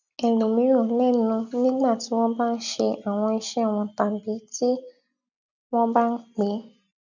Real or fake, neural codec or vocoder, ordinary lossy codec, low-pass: real; none; none; 7.2 kHz